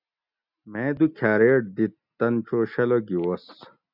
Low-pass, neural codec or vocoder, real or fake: 5.4 kHz; none; real